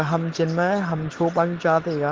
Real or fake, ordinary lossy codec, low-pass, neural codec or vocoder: real; Opus, 16 kbps; 7.2 kHz; none